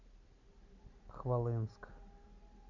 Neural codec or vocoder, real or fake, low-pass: none; real; 7.2 kHz